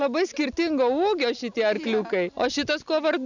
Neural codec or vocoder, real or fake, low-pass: none; real; 7.2 kHz